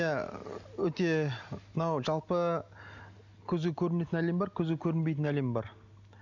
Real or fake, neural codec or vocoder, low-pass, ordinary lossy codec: real; none; 7.2 kHz; none